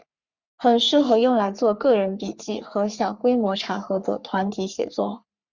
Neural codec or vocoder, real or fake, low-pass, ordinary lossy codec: codec, 44.1 kHz, 3.4 kbps, Pupu-Codec; fake; 7.2 kHz; Opus, 64 kbps